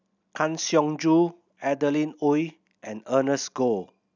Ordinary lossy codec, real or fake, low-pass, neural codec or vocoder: none; real; 7.2 kHz; none